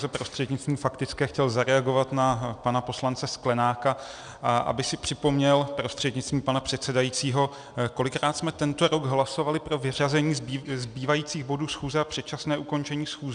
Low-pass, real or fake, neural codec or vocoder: 9.9 kHz; real; none